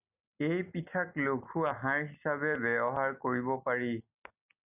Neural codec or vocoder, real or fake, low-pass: none; real; 3.6 kHz